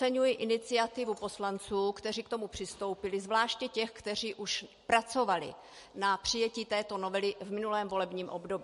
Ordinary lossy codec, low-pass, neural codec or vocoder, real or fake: MP3, 48 kbps; 14.4 kHz; none; real